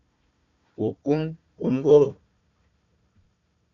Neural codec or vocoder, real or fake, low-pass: codec, 16 kHz, 1 kbps, FunCodec, trained on Chinese and English, 50 frames a second; fake; 7.2 kHz